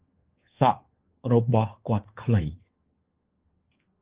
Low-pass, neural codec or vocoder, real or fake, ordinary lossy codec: 3.6 kHz; codec, 24 kHz, 1.2 kbps, DualCodec; fake; Opus, 16 kbps